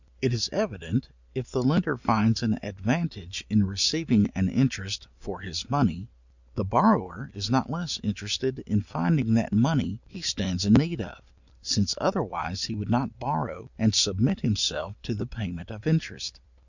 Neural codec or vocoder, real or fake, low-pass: none; real; 7.2 kHz